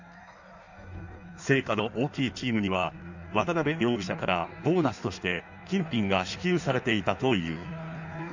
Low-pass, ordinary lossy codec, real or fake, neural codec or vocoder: 7.2 kHz; none; fake; codec, 16 kHz in and 24 kHz out, 1.1 kbps, FireRedTTS-2 codec